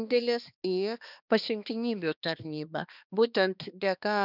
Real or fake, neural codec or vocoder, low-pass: fake; codec, 16 kHz, 2 kbps, X-Codec, HuBERT features, trained on balanced general audio; 5.4 kHz